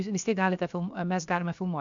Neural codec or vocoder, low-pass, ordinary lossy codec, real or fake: codec, 16 kHz, about 1 kbps, DyCAST, with the encoder's durations; 7.2 kHz; AAC, 64 kbps; fake